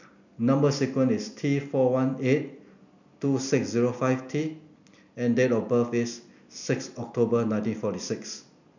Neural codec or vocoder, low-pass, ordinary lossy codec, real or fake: none; 7.2 kHz; none; real